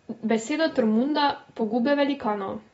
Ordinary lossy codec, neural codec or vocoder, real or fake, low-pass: AAC, 24 kbps; none; real; 19.8 kHz